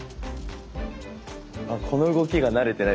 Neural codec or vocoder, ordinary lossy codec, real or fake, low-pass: none; none; real; none